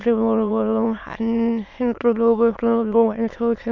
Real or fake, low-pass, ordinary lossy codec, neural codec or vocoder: fake; 7.2 kHz; none; autoencoder, 22.05 kHz, a latent of 192 numbers a frame, VITS, trained on many speakers